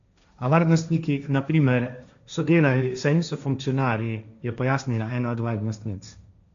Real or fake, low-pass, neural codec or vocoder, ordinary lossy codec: fake; 7.2 kHz; codec, 16 kHz, 1.1 kbps, Voila-Tokenizer; MP3, 64 kbps